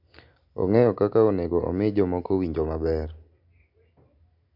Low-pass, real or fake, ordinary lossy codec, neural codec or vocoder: 5.4 kHz; real; none; none